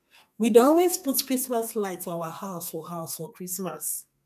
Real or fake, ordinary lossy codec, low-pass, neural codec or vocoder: fake; none; 14.4 kHz; codec, 32 kHz, 1.9 kbps, SNAC